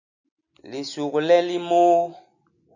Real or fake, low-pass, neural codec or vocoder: real; 7.2 kHz; none